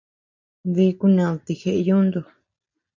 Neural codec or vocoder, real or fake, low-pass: none; real; 7.2 kHz